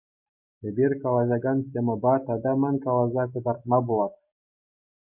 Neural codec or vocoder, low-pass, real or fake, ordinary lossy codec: none; 3.6 kHz; real; MP3, 32 kbps